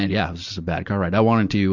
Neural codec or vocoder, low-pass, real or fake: none; 7.2 kHz; real